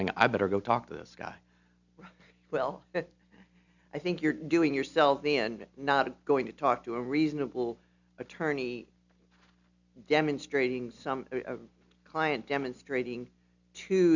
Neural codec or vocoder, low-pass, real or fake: none; 7.2 kHz; real